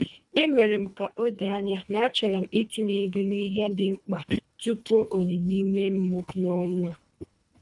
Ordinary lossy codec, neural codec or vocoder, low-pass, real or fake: none; codec, 24 kHz, 1.5 kbps, HILCodec; 10.8 kHz; fake